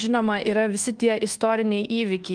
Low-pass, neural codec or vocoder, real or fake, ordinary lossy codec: 9.9 kHz; codec, 24 kHz, 1.2 kbps, DualCodec; fake; Opus, 24 kbps